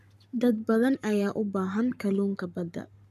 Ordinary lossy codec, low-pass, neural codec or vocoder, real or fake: none; 14.4 kHz; codec, 44.1 kHz, 7.8 kbps, Pupu-Codec; fake